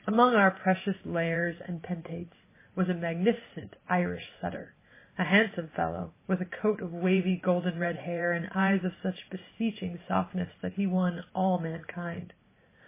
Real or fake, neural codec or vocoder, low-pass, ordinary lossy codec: fake; vocoder, 44.1 kHz, 128 mel bands every 512 samples, BigVGAN v2; 3.6 kHz; MP3, 16 kbps